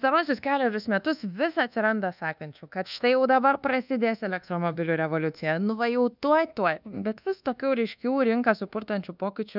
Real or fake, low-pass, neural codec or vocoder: fake; 5.4 kHz; codec, 24 kHz, 1.2 kbps, DualCodec